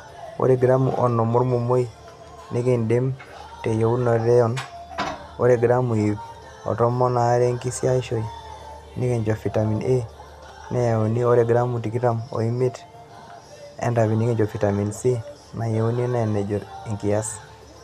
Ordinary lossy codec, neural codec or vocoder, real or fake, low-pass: none; none; real; 14.4 kHz